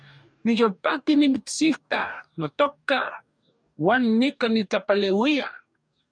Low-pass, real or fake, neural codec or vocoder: 9.9 kHz; fake; codec, 44.1 kHz, 2.6 kbps, DAC